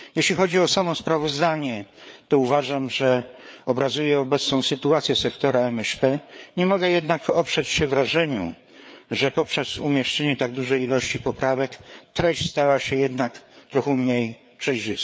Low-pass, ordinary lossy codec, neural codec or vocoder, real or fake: none; none; codec, 16 kHz, 4 kbps, FreqCodec, larger model; fake